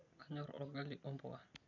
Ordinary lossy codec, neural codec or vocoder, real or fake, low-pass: none; none; real; 7.2 kHz